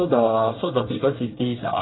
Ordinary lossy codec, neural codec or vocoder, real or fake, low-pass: AAC, 16 kbps; codec, 24 kHz, 1 kbps, SNAC; fake; 7.2 kHz